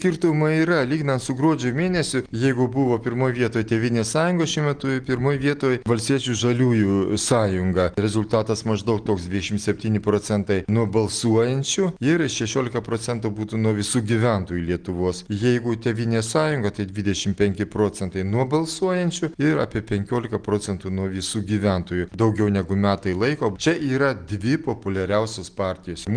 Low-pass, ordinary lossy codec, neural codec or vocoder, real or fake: 9.9 kHz; Opus, 24 kbps; none; real